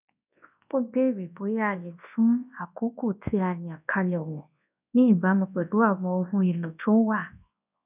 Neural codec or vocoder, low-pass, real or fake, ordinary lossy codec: codec, 24 kHz, 0.9 kbps, WavTokenizer, large speech release; 3.6 kHz; fake; none